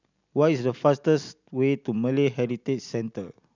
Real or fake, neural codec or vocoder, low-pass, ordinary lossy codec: real; none; 7.2 kHz; none